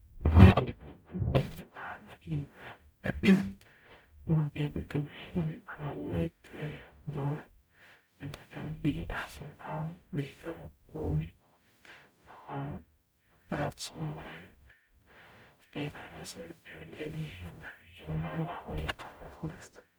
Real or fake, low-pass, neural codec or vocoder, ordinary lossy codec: fake; none; codec, 44.1 kHz, 0.9 kbps, DAC; none